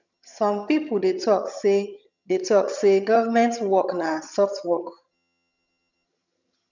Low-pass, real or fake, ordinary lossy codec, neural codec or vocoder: 7.2 kHz; fake; none; vocoder, 22.05 kHz, 80 mel bands, HiFi-GAN